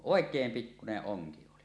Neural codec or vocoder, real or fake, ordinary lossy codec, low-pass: none; real; none; none